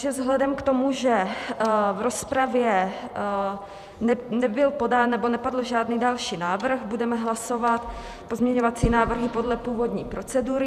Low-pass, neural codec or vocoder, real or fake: 14.4 kHz; vocoder, 48 kHz, 128 mel bands, Vocos; fake